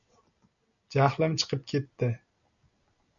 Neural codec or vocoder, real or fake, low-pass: none; real; 7.2 kHz